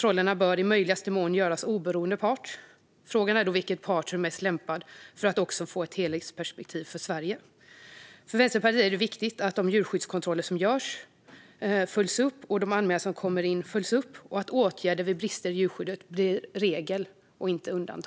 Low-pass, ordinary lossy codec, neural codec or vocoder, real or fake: none; none; none; real